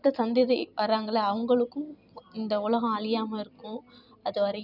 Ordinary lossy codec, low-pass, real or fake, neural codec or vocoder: none; 5.4 kHz; fake; vocoder, 44.1 kHz, 128 mel bands every 256 samples, BigVGAN v2